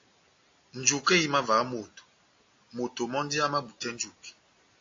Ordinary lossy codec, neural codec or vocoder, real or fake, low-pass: AAC, 48 kbps; none; real; 7.2 kHz